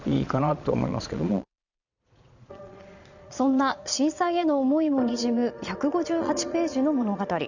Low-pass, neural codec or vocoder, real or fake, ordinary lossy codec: 7.2 kHz; vocoder, 22.05 kHz, 80 mel bands, Vocos; fake; none